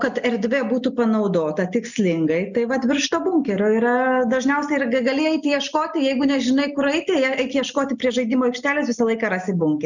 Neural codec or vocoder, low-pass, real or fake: none; 7.2 kHz; real